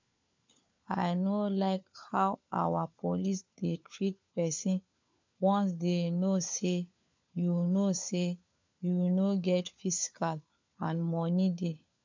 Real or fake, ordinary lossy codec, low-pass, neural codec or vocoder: fake; MP3, 64 kbps; 7.2 kHz; codec, 16 kHz, 4 kbps, FunCodec, trained on LibriTTS, 50 frames a second